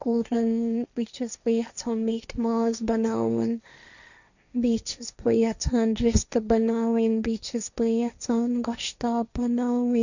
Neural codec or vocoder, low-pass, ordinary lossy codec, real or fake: codec, 16 kHz, 1.1 kbps, Voila-Tokenizer; 7.2 kHz; none; fake